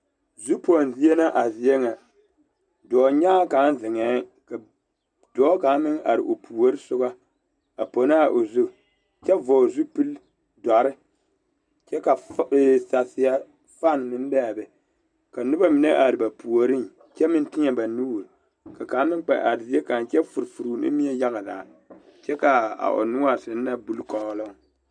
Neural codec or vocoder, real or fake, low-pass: none; real; 9.9 kHz